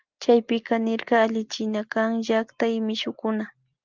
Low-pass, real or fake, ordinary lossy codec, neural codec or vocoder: 7.2 kHz; real; Opus, 24 kbps; none